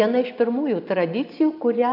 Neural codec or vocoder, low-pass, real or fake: none; 5.4 kHz; real